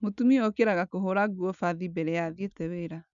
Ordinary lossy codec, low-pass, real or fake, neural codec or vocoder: none; 7.2 kHz; real; none